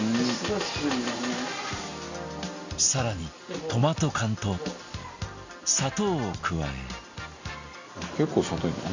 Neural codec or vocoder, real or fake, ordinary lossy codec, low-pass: none; real; Opus, 64 kbps; 7.2 kHz